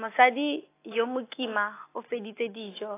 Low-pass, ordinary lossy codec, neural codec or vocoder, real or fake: 3.6 kHz; AAC, 24 kbps; none; real